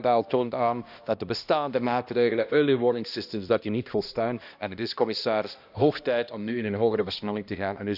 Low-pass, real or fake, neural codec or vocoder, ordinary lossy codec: 5.4 kHz; fake; codec, 16 kHz, 1 kbps, X-Codec, HuBERT features, trained on balanced general audio; none